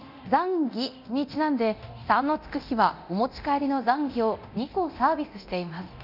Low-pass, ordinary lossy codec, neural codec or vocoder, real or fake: 5.4 kHz; none; codec, 24 kHz, 0.9 kbps, DualCodec; fake